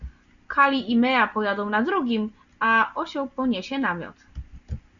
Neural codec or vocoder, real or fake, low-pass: none; real; 7.2 kHz